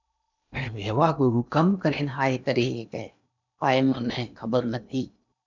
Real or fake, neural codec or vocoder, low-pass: fake; codec, 16 kHz in and 24 kHz out, 0.8 kbps, FocalCodec, streaming, 65536 codes; 7.2 kHz